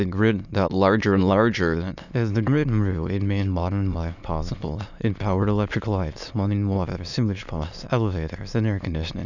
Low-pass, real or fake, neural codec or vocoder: 7.2 kHz; fake; autoencoder, 22.05 kHz, a latent of 192 numbers a frame, VITS, trained on many speakers